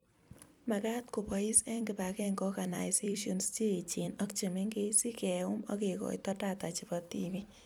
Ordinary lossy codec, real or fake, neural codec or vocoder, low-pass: none; fake; vocoder, 44.1 kHz, 128 mel bands every 256 samples, BigVGAN v2; none